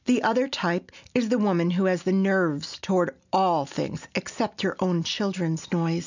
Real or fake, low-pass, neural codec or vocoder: real; 7.2 kHz; none